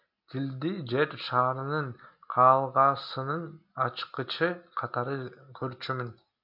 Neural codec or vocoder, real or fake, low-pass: none; real; 5.4 kHz